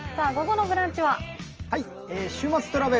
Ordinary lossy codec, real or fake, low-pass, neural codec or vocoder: Opus, 24 kbps; real; 7.2 kHz; none